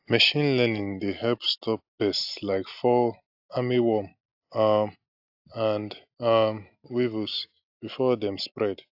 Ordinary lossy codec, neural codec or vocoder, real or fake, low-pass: AAC, 48 kbps; none; real; 5.4 kHz